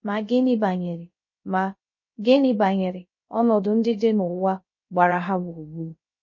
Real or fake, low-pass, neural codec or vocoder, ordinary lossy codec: fake; 7.2 kHz; codec, 16 kHz, 0.3 kbps, FocalCodec; MP3, 32 kbps